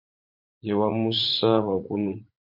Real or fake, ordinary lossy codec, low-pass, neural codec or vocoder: fake; MP3, 32 kbps; 5.4 kHz; vocoder, 44.1 kHz, 80 mel bands, Vocos